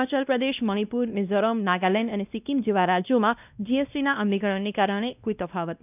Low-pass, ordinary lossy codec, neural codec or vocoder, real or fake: 3.6 kHz; none; codec, 16 kHz, 1 kbps, X-Codec, WavLM features, trained on Multilingual LibriSpeech; fake